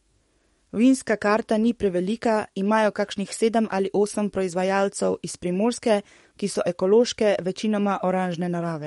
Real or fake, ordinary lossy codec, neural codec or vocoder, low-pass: fake; MP3, 48 kbps; codec, 44.1 kHz, 7.8 kbps, DAC; 19.8 kHz